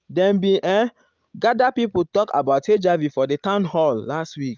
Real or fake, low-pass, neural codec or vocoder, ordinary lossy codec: real; 7.2 kHz; none; Opus, 24 kbps